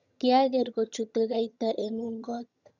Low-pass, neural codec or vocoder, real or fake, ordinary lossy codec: 7.2 kHz; vocoder, 22.05 kHz, 80 mel bands, HiFi-GAN; fake; none